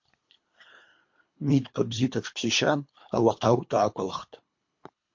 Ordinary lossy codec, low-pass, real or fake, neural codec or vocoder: MP3, 48 kbps; 7.2 kHz; fake; codec, 24 kHz, 3 kbps, HILCodec